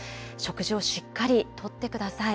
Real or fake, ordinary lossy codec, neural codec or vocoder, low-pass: real; none; none; none